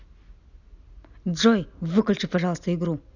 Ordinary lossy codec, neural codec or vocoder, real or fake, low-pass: none; none; real; 7.2 kHz